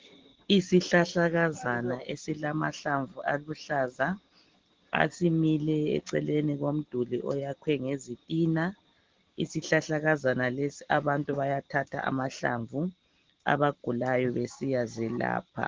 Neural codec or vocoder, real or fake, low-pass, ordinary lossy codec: none; real; 7.2 kHz; Opus, 16 kbps